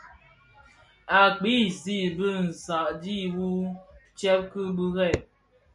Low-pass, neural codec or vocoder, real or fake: 10.8 kHz; none; real